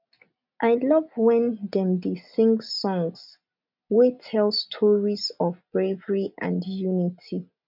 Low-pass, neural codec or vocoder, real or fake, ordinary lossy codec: 5.4 kHz; none; real; none